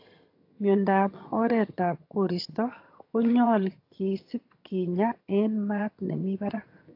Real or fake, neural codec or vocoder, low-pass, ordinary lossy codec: fake; vocoder, 22.05 kHz, 80 mel bands, HiFi-GAN; 5.4 kHz; AAC, 32 kbps